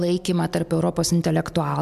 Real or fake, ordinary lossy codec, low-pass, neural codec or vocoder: real; MP3, 96 kbps; 14.4 kHz; none